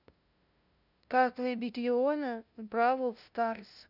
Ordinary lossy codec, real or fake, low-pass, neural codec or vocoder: none; fake; 5.4 kHz; codec, 16 kHz, 0.5 kbps, FunCodec, trained on LibriTTS, 25 frames a second